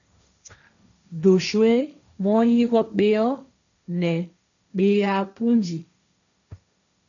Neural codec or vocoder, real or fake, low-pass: codec, 16 kHz, 1.1 kbps, Voila-Tokenizer; fake; 7.2 kHz